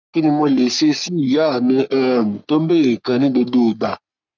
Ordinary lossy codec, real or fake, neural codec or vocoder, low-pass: none; fake; codec, 44.1 kHz, 3.4 kbps, Pupu-Codec; 7.2 kHz